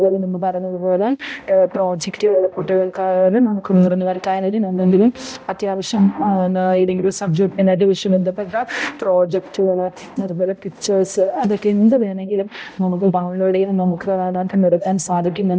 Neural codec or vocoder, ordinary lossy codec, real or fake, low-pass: codec, 16 kHz, 0.5 kbps, X-Codec, HuBERT features, trained on balanced general audio; none; fake; none